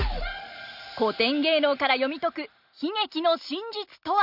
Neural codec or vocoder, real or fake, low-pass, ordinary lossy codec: none; real; 5.4 kHz; none